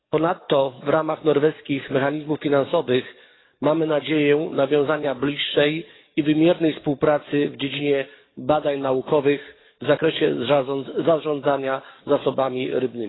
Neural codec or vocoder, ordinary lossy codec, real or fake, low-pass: codec, 44.1 kHz, 7.8 kbps, DAC; AAC, 16 kbps; fake; 7.2 kHz